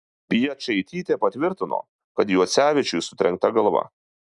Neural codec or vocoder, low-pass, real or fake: none; 10.8 kHz; real